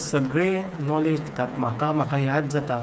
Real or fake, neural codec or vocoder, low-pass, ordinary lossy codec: fake; codec, 16 kHz, 4 kbps, FreqCodec, smaller model; none; none